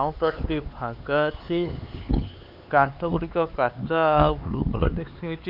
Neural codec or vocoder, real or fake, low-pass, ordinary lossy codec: codec, 16 kHz, 4 kbps, X-Codec, HuBERT features, trained on LibriSpeech; fake; 5.4 kHz; none